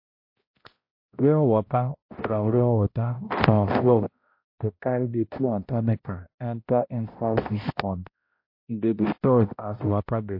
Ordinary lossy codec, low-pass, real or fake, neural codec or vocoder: MP3, 32 kbps; 5.4 kHz; fake; codec, 16 kHz, 0.5 kbps, X-Codec, HuBERT features, trained on balanced general audio